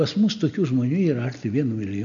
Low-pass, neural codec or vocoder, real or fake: 7.2 kHz; none; real